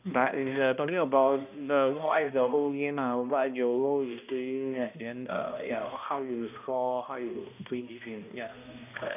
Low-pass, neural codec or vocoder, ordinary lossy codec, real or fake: 3.6 kHz; codec, 16 kHz, 1 kbps, X-Codec, HuBERT features, trained on balanced general audio; none; fake